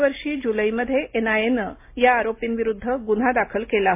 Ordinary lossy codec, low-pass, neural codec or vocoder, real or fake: MP3, 24 kbps; 3.6 kHz; none; real